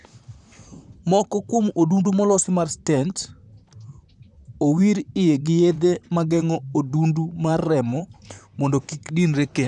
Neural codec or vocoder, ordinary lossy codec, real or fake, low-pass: vocoder, 44.1 kHz, 128 mel bands, Pupu-Vocoder; none; fake; 10.8 kHz